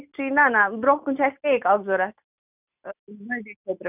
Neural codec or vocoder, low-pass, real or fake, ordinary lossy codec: none; 3.6 kHz; real; none